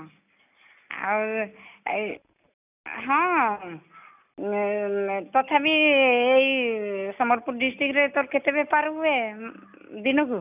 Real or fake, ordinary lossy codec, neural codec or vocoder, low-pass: real; none; none; 3.6 kHz